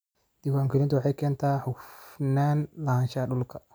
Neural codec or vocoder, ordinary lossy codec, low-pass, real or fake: none; none; none; real